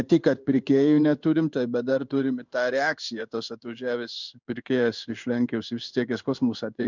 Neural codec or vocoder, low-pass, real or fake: codec, 16 kHz in and 24 kHz out, 1 kbps, XY-Tokenizer; 7.2 kHz; fake